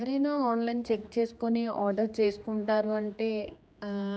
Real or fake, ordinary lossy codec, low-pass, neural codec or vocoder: fake; none; none; codec, 16 kHz, 2 kbps, X-Codec, HuBERT features, trained on general audio